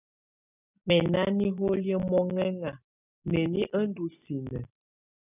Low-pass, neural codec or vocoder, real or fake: 3.6 kHz; none; real